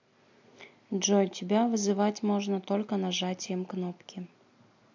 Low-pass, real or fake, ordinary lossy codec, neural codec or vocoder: 7.2 kHz; real; MP3, 48 kbps; none